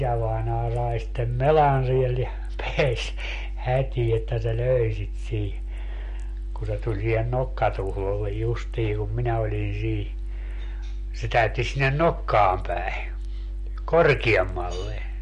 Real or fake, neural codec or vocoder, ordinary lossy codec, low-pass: real; none; MP3, 48 kbps; 14.4 kHz